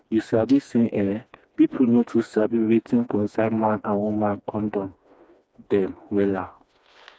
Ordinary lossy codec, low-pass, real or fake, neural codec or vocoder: none; none; fake; codec, 16 kHz, 2 kbps, FreqCodec, smaller model